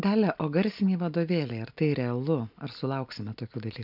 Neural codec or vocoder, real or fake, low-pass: none; real; 5.4 kHz